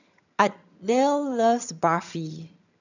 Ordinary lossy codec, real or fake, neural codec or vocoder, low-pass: none; fake; vocoder, 22.05 kHz, 80 mel bands, HiFi-GAN; 7.2 kHz